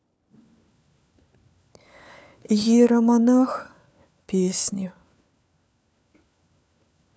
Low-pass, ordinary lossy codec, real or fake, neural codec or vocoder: none; none; fake; codec, 16 kHz, 4 kbps, FunCodec, trained on LibriTTS, 50 frames a second